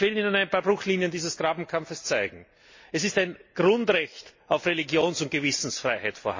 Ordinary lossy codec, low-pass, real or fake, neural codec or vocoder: MP3, 32 kbps; 7.2 kHz; real; none